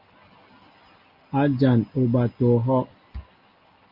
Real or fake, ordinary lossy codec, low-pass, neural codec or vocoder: real; Opus, 32 kbps; 5.4 kHz; none